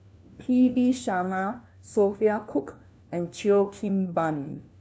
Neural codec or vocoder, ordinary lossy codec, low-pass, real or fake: codec, 16 kHz, 1 kbps, FunCodec, trained on LibriTTS, 50 frames a second; none; none; fake